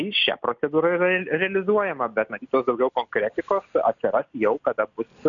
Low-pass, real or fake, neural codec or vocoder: 7.2 kHz; real; none